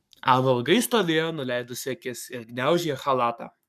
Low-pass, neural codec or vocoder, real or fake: 14.4 kHz; codec, 44.1 kHz, 7.8 kbps, Pupu-Codec; fake